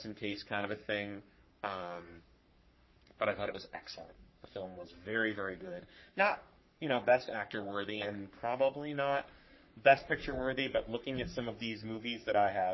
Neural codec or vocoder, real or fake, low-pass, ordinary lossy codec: codec, 44.1 kHz, 3.4 kbps, Pupu-Codec; fake; 7.2 kHz; MP3, 24 kbps